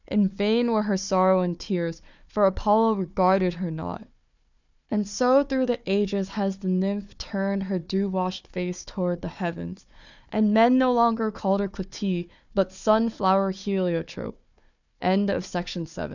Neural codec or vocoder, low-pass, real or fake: codec, 16 kHz, 4 kbps, FunCodec, trained on Chinese and English, 50 frames a second; 7.2 kHz; fake